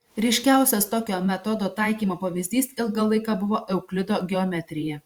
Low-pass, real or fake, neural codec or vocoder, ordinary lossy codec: 19.8 kHz; fake; vocoder, 44.1 kHz, 128 mel bands every 512 samples, BigVGAN v2; Opus, 64 kbps